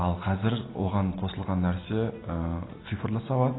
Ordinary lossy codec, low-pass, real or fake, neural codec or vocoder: AAC, 16 kbps; 7.2 kHz; real; none